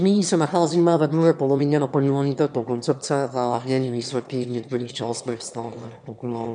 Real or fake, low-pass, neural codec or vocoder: fake; 9.9 kHz; autoencoder, 22.05 kHz, a latent of 192 numbers a frame, VITS, trained on one speaker